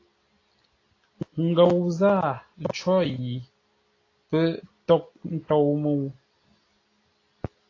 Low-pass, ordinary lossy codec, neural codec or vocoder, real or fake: 7.2 kHz; AAC, 32 kbps; none; real